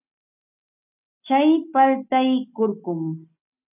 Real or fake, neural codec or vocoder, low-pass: real; none; 3.6 kHz